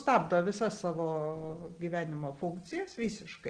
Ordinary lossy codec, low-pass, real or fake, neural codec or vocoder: Opus, 16 kbps; 9.9 kHz; real; none